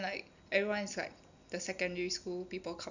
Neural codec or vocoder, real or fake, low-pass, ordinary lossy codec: none; real; 7.2 kHz; none